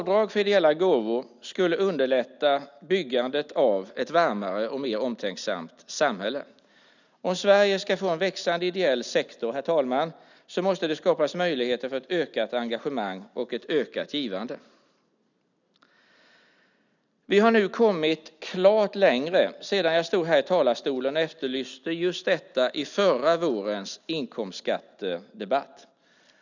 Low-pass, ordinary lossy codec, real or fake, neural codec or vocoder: 7.2 kHz; none; real; none